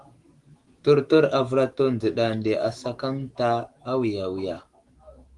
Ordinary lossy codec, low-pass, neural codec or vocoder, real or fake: Opus, 24 kbps; 10.8 kHz; autoencoder, 48 kHz, 128 numbers a frame, DAC-VAE, trained on Japanese speech; fake